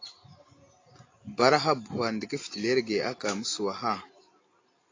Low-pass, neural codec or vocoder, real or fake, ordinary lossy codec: 7.2 kHz; none; real; AAC, 32 kbps